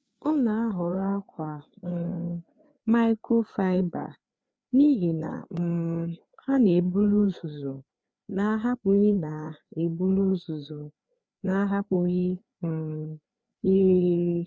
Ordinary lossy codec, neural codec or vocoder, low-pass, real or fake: none; codec, 16 kHz, 4 kbps, FreqCodec, larger model; none; fake